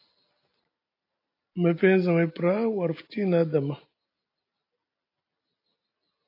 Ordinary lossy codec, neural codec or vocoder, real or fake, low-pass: AAC, 32 kbps; none; real; 5.4 kHz